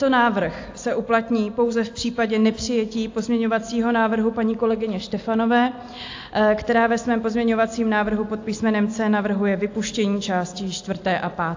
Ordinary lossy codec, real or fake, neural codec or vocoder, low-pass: AAC, 48 kbps; real; none; 7.2 kHz